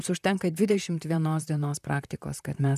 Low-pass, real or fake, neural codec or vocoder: 14.4 kHz; fake; vocoder, 44.1 kHz, 128 mel bands, Pupu-Vocoder